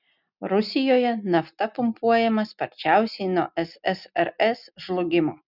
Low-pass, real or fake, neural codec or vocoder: 5.4 kHz; real; none